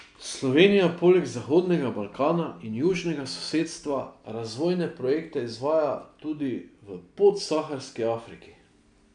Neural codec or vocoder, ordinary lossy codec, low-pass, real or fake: none; none; 9.9 kHz; real